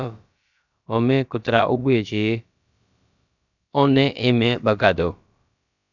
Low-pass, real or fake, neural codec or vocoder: 7.2 kHz; fake; codec, 16 kHz, about 1 kbps, DyCAST, with the encoder's durations